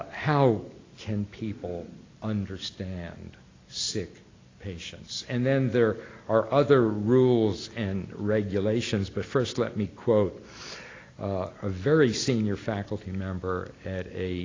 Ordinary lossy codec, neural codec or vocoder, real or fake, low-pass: AAC, 32 kbps; none; real; 7.2 kHz